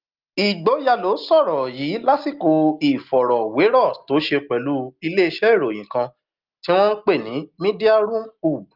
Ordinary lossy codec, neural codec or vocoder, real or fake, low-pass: Opus, 24 kbps; none; real; 5.4 kHz